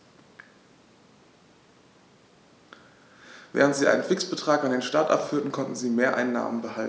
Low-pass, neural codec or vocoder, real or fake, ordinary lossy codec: none; none; real; none